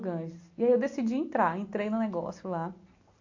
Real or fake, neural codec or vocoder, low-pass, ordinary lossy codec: real; none; 7.2 kHz; AAC, 48 kbps